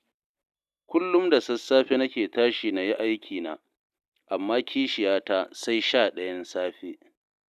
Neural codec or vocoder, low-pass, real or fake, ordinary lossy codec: none; 14.4 kHz; real; none